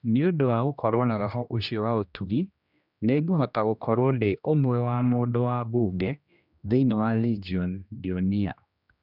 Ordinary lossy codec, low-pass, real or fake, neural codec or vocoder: none; 5.4 kHz; fake; codec, 16 kHz, 1 kbps, X-Codec, HuBERT features, trained on general audio